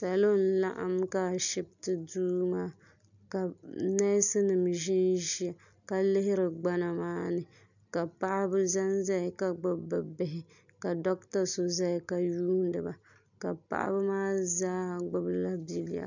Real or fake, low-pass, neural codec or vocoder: real; 7.2 kHz; none